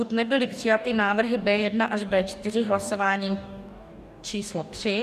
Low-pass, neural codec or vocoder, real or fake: 14.4 kHz; codec, 44.1 kHz, 2.6 kbps, DAC; fake